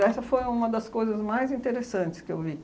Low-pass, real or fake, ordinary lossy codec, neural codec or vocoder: none; real; none; none